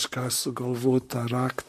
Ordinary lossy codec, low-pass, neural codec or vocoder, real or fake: MP3, 64 kbps; 14.4 kHz; vocoder, 44.1 kHz, 128 mel bands, Pupu-Vocoder; fake